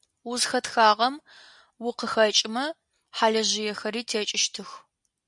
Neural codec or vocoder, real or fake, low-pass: none; real; 10.8 kHz